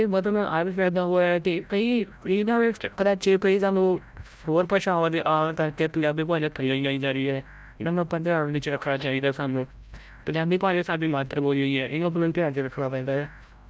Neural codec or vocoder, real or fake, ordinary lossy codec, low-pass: codec, 16 kHz, 0.5 kbps, FreqCodec, larger model; fake; none; none